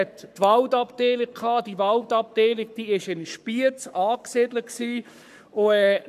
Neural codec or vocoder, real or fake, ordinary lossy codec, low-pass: codec, 44.1 kHz, 7.8 kbps, Pupu-Codec; fake; none; 14.4 kHz